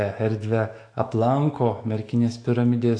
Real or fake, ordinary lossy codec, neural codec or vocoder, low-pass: fake; AAC, 48 kbps; autoencoder, 48 kHz, 128 numbers a frame, DAC-VAE, trained on Japanese speech; 9.9 kHz